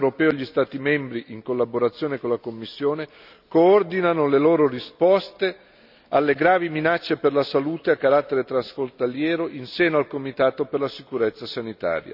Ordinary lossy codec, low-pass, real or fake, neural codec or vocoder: none; 5.4 kHz; real; none